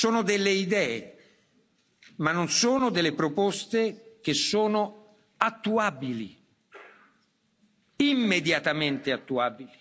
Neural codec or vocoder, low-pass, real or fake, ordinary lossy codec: none; none; real; none